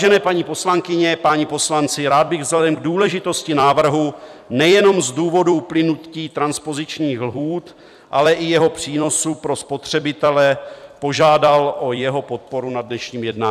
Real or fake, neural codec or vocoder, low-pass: fake; vocoder, 44.1 kHz, 128 mel bands every 256 samples, BigVGAN v2; 14.4 kHz